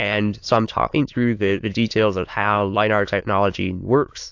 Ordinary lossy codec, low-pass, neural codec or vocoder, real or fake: AAC, 48 kbps; 7.2 kHz; autoencoder, 22.05 kHz, a latent of 192 numbers a frame, VITS, trained on many speakers; fake